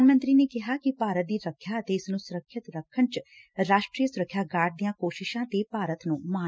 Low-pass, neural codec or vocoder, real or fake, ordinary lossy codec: none; none; real; none